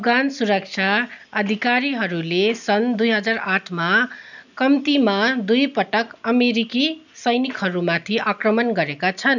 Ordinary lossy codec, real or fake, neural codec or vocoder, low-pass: none; real; none; 7.2 kHz